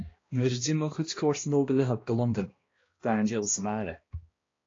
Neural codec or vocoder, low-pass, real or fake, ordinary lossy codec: codec, 16 kHz, 1 kbps, X-Codec, HuBERT features, trained on balanced general audio; 7.2 kHz; fake; AAC, 32 kbps